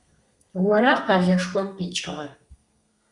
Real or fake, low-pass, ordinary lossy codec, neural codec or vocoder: fake; 10.8 kHz; Opus, 64 kbps; codec, 32 kHz, 1.9 kbps, SNAC